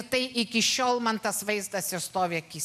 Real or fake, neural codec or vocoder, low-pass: real; none; 14.4 kHz